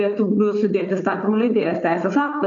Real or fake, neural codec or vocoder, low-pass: fake; codec, 16 kHz, 4 kbps, FunCodec, trained on Chinese and English, 50 frames a second; 7.2 kHz